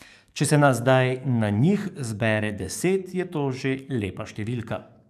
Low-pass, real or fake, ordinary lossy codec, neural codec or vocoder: 14.4 kHz; fake; none; codec, 44.1 kHz, 7.8 kbps, DAC